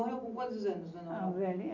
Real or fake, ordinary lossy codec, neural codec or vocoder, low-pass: real; none; none; 7.2 kHz